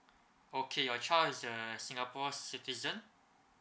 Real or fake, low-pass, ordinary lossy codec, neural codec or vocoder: real; none; none; none